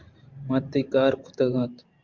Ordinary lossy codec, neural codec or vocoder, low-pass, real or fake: Opus, 24 kbps; vocoder, 44.1 kHz, 128 mel bands every 512 samples, BigVGAN v2; 7.2 kHz; fake